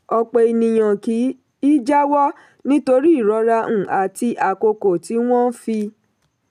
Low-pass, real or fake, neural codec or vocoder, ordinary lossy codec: 14.4 kHz; real; none; none